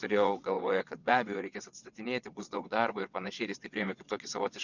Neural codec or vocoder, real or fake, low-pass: vocoder, 22.05 kHz, 80 mel bands, WaveNeXt; fake; 7.2 kHz